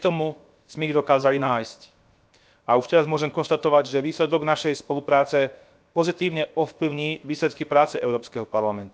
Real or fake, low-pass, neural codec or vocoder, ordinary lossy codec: fake; none; codec, 16 kHz, 0.7 kbps, FocalCodec; none